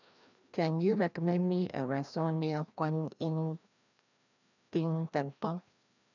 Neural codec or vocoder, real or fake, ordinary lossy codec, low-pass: codec, 16 kHz, 1 kbps, FreqCodec, larger model; fake; none; 7.2 kHz